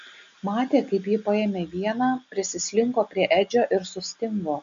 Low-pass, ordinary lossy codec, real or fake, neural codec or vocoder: 7.2 kHz; MP3, 64 kbps; real; none